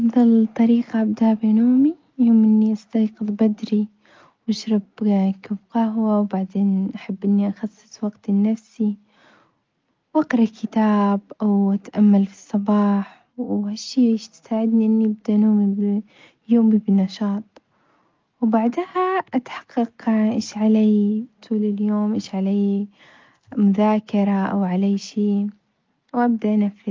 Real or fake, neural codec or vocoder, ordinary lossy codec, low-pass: real; none; Opus, 24 kbps; 7.2 kHz